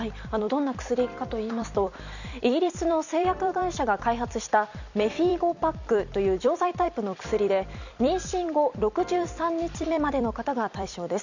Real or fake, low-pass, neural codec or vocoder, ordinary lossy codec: fake; 7.2 kHz; vocoder, 44.1 kHz, 128 mel bands every 256 samples, BigVGAN v2; none